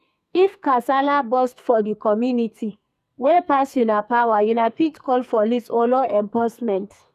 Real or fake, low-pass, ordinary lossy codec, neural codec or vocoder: fake; 14.4 kHz; none; codec, 44.1 kHz, 2.6 kbps, SNAC